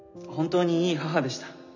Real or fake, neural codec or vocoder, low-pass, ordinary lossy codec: real; none; 7.2 kHz; none